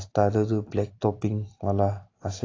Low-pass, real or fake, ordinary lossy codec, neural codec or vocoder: 7.2 kHz; real; AAC, 32 kbps; none